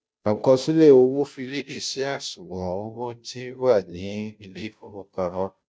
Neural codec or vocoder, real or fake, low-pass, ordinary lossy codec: codec, 16 kHz, 0.5 kbps, FunCodec, trained on Chinese and English, 25 frames a second; fake; none; none